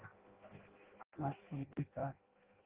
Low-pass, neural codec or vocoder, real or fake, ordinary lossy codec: 3.6 kHz; codec, 16 kHz in and 24 kHz out, 0.6 kbps, FireRedTTS-2 codec; fake; Opus, 16 kbps